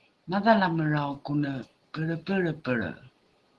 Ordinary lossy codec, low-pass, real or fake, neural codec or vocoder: Opus, 16 kbps; 10.8 kHz; real; none